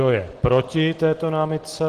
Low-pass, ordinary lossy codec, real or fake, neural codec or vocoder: 14.4 kHz; Opus, 16 kbps; real; none